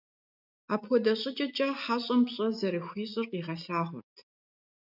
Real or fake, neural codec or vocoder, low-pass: real; none; 5.4 kHz